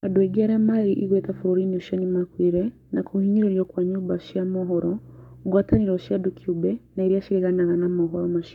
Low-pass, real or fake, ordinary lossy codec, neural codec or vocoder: 19.8 kHz; fake; none; codec, 44.1 kHz, 7.8 kbps, Pupu-Codec